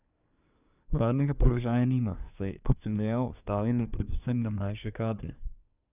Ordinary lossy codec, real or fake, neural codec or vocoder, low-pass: none; fake; codec, 24 kHz, 1 kbps, SNAC; 3.6 kHz